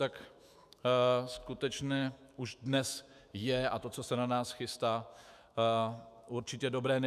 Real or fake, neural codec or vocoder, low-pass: fake; autoencoder, 48 kHz, 128 numbers a frame, DAC-VAE, trained on Japanese speech; 14.4 kHz